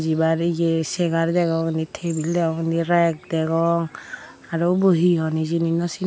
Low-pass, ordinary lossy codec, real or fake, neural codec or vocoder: none; none; real; none